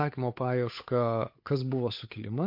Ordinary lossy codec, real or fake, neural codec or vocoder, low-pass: MP3, 32 kbps; fake; codec, 16 kHz, 8 kbps, FunCodec, trained on Chinese and English, 25 frames a second; 5.4 kHz